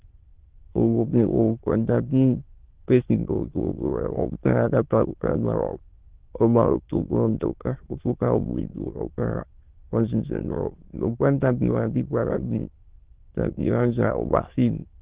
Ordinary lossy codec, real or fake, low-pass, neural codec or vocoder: Opus, 16 kbps; fake; 3.6 kHz; autoencoder, 22.05 kHz, a latent of 192 numbers a frame, VITS, trained on many speakers